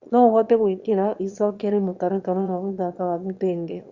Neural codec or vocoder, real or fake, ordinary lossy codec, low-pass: autoencoder, 22.05 kHz, a latent of 192 numbers a frame, VITS, trained on one speaker; fake; Opus, 64 kbps; 7.2 kHz